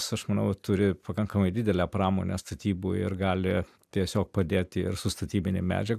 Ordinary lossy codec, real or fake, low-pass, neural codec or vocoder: AAC, 96 kbps; real; 14.4 kHz; none